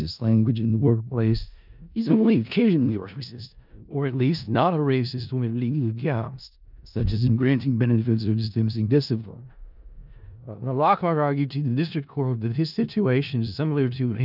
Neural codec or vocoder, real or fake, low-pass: codec, 16 kHz in and 24 kHz out, 0.4 kbps, LongCat-Audio-Codec, four codebook decoder; fake; 5.4 kHz